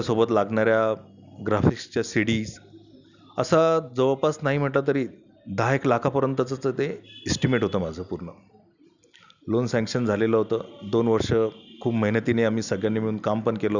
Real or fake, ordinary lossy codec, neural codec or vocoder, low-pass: real; none; none; 7.2 kHz